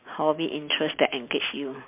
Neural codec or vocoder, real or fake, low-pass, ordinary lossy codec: none; real; 3.6 kHz; MP3, 32 kbps